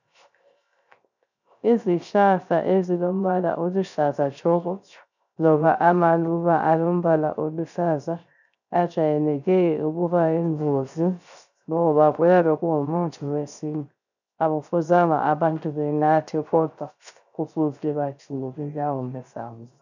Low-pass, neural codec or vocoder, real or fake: 7.2 kHz; codec, 16 kHz, 0.3 kbps, FocalCodec; fake